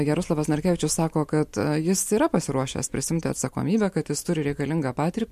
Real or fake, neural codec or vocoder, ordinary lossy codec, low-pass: real; none; MP3, 64 kbps; 14.4 kHz